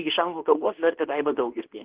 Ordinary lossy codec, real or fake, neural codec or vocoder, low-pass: Opus, 16 kbps; fake; codec, 24 kHz, 0.9 kbps, WavTokenizer, medium speech release version 2; 3.6 kHz